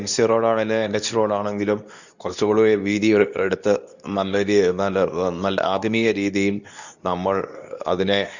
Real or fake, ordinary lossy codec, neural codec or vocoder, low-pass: fake; none; codec, 24 kHz, 0.9 kbps, WavTokenizer, medium speech release version 2; 7.2 kHz